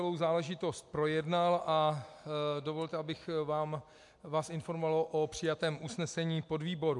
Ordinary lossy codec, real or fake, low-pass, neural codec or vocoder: MP3, 64 kbps; real; 10.8 kHz; none